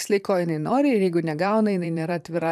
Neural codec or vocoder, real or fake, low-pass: vocoder, 44.1 kHz, 128 mel bands, Pupu-Vocoder; fake; 14.4 kHz